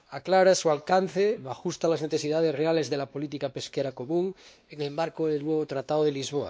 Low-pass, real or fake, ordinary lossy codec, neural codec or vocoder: none; fake; none; codec, 16 kHz, 2 kbps, X-Codec, WavLM features, trained on Multilingual LibriSpeech